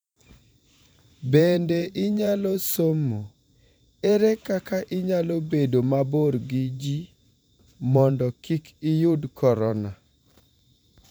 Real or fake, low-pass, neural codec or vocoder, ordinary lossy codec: fake; none; vocoder, 44.1 kHz, 128 mel bands every 512 samples, BigVGAN v2; none